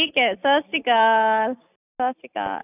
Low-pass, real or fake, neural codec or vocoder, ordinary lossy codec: 3.6 kHz; real; none; none